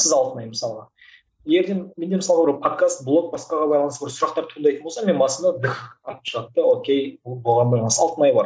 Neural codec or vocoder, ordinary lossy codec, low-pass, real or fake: none; none; none; real